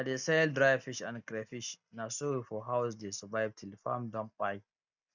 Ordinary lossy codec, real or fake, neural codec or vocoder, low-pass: none; real; none; 7.2 kHz